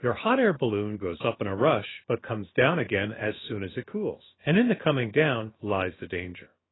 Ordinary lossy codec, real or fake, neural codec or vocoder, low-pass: AAC, 16 kbps; fake; codec, 16 kHz, about 1 kbps, DyCAST, with the encoder's durations; 7.2 kHz